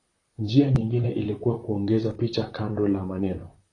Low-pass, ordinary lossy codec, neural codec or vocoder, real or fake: 10.8 kHz; AAC, 32 kbps; vocoder, 44.1 kHz, 128 mel bands, Pupu-Vocoder; fake